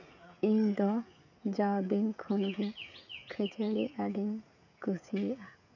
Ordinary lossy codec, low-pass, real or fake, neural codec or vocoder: none; 7.2 kHz; fake; codec, 16 kHz, 16 kbps, FreqCodec, smaller model